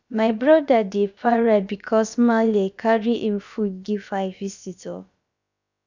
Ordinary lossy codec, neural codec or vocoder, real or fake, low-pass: none; codec, 16 kHz, about 1 kbps, DyCAST, with the encoder's durations; fake; 7.2 kHz